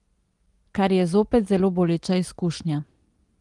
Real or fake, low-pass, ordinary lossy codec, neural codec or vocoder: real; 10.8 kHz; Opus, 24 kbps; none